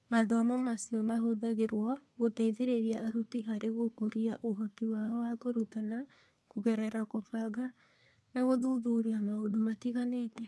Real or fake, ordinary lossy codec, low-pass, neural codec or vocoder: fake; none; none; codec, 24 kHz, 1 kbps, SNAC